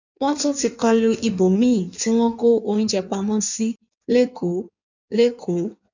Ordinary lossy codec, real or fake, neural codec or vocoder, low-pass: none; fake; codec, 16 kHz in and 24 kHz out, 1.1 kbps, FireRedTTS-2 codec; 7.2 kHz